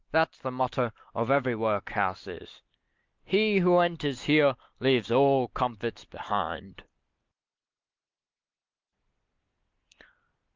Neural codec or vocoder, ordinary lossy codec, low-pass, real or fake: none; Opus, 32 kbps; 7.2 kHz; real